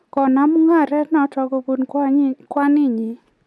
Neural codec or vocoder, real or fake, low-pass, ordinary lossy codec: none; real; none; none